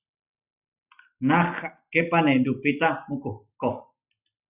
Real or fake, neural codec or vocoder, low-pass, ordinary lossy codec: real; none; 3.6 kHz; Opus, 64 kbps